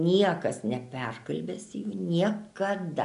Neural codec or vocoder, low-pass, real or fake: none; 10.8 kHz; real